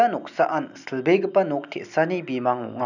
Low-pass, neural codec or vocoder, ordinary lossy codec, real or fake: 7.2 kHz; none; none; real